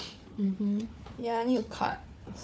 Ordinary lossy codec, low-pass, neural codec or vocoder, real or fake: none; none; codec, 16 kHz, 4 kbps, FunCodec, trained on Chinese and English, 50 frames a second; fake